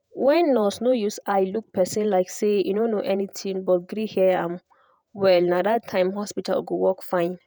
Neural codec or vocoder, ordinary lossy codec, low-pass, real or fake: vocoder, 48 kHz, 128 mel bands, Vocos; none; none; fake